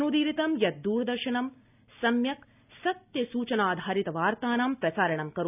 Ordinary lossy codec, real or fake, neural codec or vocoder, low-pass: none; real; none; 3.6 kHz